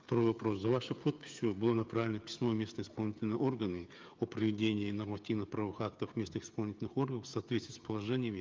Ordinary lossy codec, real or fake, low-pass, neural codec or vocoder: Opus, 24 kbps; fake; 7.2 kHz; codec, 16 kHz, 16 kbps, FreqCodec, smaller model